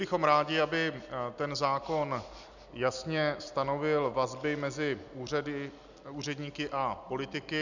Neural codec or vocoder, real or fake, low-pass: none; real; 7.2 kHz